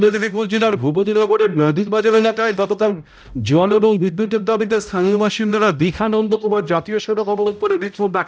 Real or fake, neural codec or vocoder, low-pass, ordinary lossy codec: fake; codec, 16 kHz, 0.5 kbps, X-Codec, HuBERT features, trained on balanced general audio; none; none